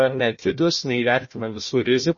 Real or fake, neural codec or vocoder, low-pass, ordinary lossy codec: fake; codec, 16 kHz, 1 kbps, FunCodec, trained on Chinese and English, 50 frames a second; 7.2 kHz; MP3, 32 kbps